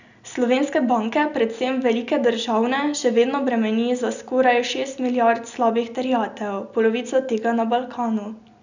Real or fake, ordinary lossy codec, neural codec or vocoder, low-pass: real; none; none; 7.2 kHz